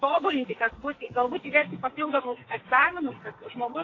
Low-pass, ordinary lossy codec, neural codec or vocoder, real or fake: 7.2 kHz; AAC, 32 kbps; codec, 44.1 kHz, 2.6 kbps, SNAC; fake